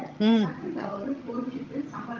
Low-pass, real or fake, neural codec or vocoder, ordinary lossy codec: 7.2 kHz; fake; vocoder, 22.05 kHz, 80 mel bands, HiFi-GAN; Opus, 32 kbps